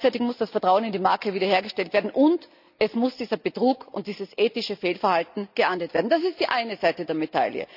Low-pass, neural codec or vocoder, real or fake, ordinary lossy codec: 5.4 kHz; none; real; none